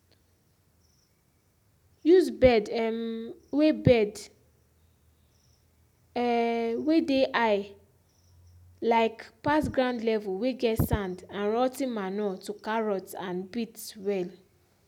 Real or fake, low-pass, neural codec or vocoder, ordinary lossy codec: real; 19.8 kHz; none; none